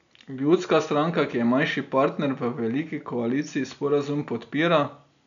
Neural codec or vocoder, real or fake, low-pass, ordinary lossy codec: none; real; 7.2 kHz; none